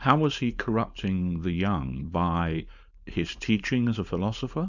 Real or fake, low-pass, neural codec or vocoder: fake; 7.2 kHz; codec, 16 kHz, 4.8 kbps, FACodec